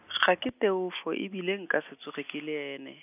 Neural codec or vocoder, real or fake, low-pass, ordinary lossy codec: none; real; 3.6 kHz; none